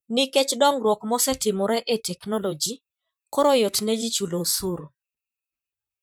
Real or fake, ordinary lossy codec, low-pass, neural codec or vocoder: fake; none; none; vocoder, 44.1 kHz, 128 mel bands, Pupu-Vocoder